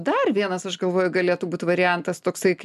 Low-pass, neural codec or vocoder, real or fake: 14.4 kHz; none; real